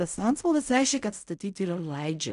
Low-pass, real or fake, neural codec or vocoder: 10.8 kHz; fake; codec, 16 kHz in and 24 kHz out, 0.4 kbps, LongCat-Audio-Codec, fine tuned four codebook decoder